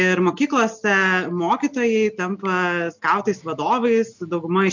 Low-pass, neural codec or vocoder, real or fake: 7.2 kHz; none; real